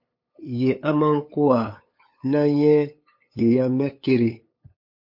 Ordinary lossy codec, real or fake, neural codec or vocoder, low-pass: MP3, 32 kbps; fake; codec, 16 kHz, 8 kbps, FunCodec, trained on LibriTTS, 25 frames a second; 5.4 kHz